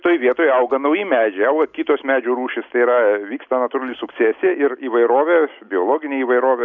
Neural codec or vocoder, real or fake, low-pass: none; real; 7.2 kHz